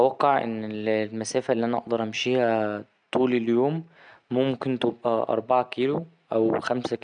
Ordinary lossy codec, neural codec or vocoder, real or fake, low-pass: none; none; real; 9.9 kHz